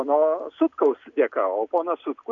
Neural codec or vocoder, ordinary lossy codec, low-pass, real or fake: none; AAC, 64 kbps; 7.2 kHz; real